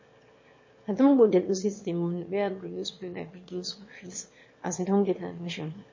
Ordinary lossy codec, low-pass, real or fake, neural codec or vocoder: MP3, 32 kbps; 7.2 kHz; fake; autoencoder, 22.05 kHz, a latent of 192 numbers a frame, VITS, trained on one speaker